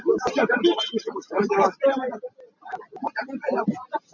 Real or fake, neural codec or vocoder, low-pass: real; none; 7.2 kHz